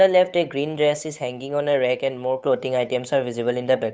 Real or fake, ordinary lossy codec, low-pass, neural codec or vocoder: fake; none; none; codec, 16 kHz, 8 kbps, FunCodec, trained on Chinese and English, 25 frames a second